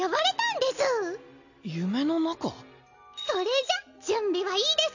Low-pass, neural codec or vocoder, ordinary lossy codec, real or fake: 7.2 kHz; none; none; real